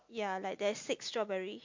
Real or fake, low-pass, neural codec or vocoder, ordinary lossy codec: real; 7.2 kHz; none; MP3, 48 kbps